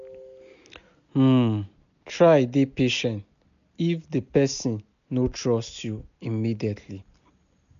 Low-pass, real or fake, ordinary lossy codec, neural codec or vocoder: 7.2 kHz; real; none; none